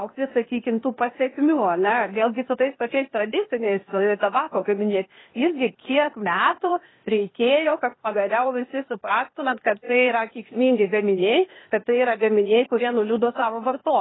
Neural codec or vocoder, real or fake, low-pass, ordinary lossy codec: codec, 16 kHz, 0.8 kbps, ZipCodec; fake; 7.2 kHz; AAC, 16 kbps